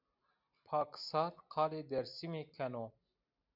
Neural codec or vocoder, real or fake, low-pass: none; real; 5.4 kHz